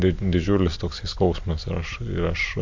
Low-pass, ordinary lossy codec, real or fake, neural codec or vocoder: 7.2 kHz; AAC, 48 kbps; real; none